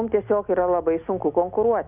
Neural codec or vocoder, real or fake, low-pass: none; real; 3.6 kHz